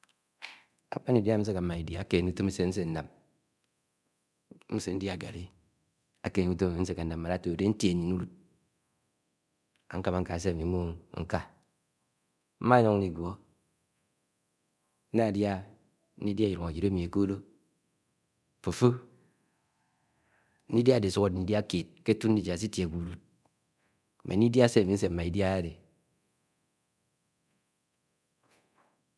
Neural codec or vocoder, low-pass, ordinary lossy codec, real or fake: codec, 24 kHz, 0.9 kbps, DualCodec; none; none; fake